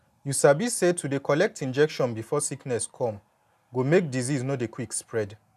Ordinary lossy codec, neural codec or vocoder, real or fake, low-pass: none; none; real; 14.4 kHz